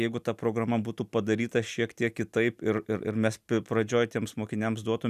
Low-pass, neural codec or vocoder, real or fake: 14.4 kHz; none; real